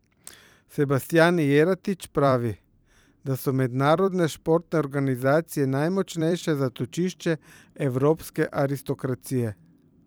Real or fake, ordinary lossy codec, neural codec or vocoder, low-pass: fake; none; vocoder, 44.1 kHz, 128 mel bands every 256 samples, BigVGAN v2; none